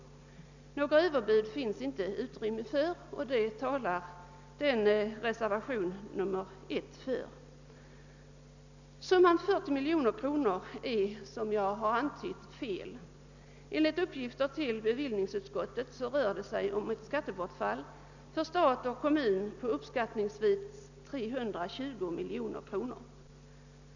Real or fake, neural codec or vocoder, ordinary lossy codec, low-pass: real; none; none; 7.2 kHz